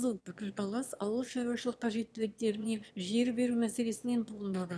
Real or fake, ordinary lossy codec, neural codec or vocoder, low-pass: fake; none; autoencoder, 22.05 kHz, a latent of 192 numbers a frame, VITS, trained on one speaker; none